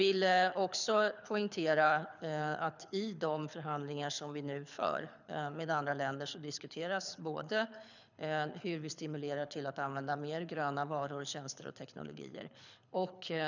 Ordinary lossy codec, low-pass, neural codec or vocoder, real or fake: none; 7.2 kHz; codec, 24 kHz, 6 kbps, HILCodec; fake